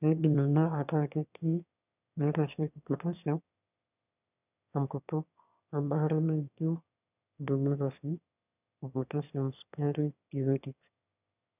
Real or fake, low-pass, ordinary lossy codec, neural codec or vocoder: fake; 3.6 kHz; none; autoencoder, 22.05 kHz, a latent of 192 numbers a frame, VITS, trained on one speaker